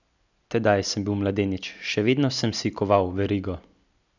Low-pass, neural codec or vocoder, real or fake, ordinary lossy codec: 7.2 kHz; none; real; none